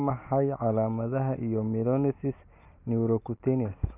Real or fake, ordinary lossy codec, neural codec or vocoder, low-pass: real; none; none; 3.6 kHz